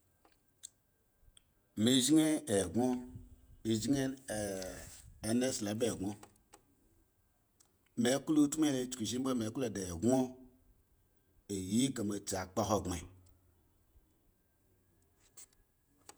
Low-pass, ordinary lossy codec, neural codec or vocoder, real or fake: none; none; vocoder, 48 kHz, 128 mel bands, Vocos; fake